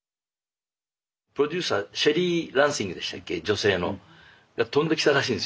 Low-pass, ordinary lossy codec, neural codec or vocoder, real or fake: none; none; none; real